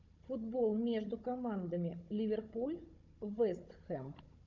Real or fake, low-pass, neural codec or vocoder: fake; 7.2 kHz; codec, 16 kHz, 16 kbps, FunCodec, trained on Chinese and English, 50 frames a second